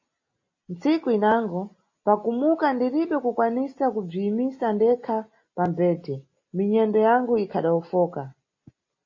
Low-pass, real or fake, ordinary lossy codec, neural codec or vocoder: 7.2 kHz; real; MP3, 32 kbps; none